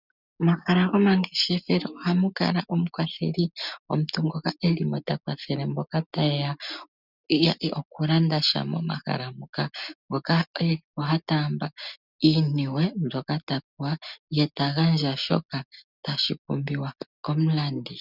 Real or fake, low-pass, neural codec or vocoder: real; 5.4 kHz; none